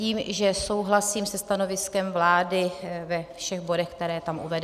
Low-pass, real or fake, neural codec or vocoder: 14.4 kHz; real; none